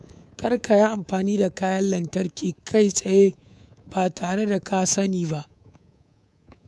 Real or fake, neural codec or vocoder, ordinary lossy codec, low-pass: fake; codec, 24 kHz, 3.1 kbps, DualCodec; none; none